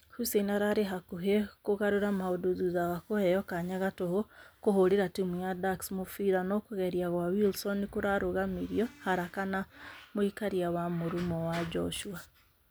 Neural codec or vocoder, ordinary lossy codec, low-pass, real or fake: none; none; none; real